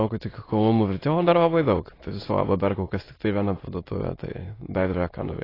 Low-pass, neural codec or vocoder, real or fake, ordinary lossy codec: 5.4 kHz; autoencoder, 22.05 kHz, a latent of 192 numbers a frame, VITS, trained on many speakers; fake; AAC, 24 kbps